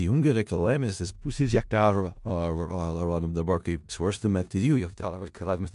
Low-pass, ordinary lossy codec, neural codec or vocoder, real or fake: 10.8 kHz; MP3, 64 kbps; codec, 16 kHz in and 24 kHz out, 0.4 kbps, LongCat-Audio-Codec, four codebook decoder; fake